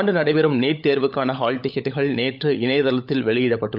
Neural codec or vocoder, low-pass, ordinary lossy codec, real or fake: codec, 16 kHz, 16 kbps, FunCodec, trained on LibriTTS, 50 frames a second; 5.4 kHz; none; fake